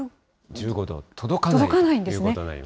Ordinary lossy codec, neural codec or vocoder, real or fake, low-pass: none; none; real; none